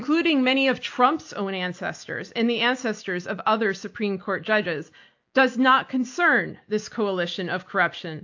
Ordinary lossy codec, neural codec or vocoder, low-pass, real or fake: AAC, 48 kbps; none; 7.2 kHz; real